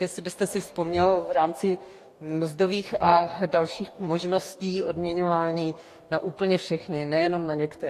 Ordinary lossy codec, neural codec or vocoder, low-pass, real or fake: AAC, 64 kbps; codec, 44.1 kHz, 2.6 kbps, DAC; 14.4 kHz; fake